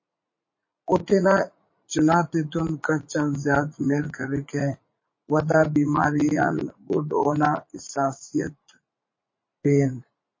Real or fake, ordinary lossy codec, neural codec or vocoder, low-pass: fake; MP3, 32 kbps; vocoder, 44.1 kHz, 80 mel bands, Vocos; 7.2 kHz